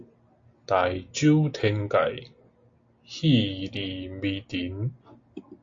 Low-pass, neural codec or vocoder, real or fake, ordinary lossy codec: 7.2 kHz; none; real; AAC, 48 kbps